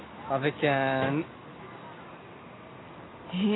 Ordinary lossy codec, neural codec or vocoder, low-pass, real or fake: AAC, 16 kbps; none; 7.2 kHz; real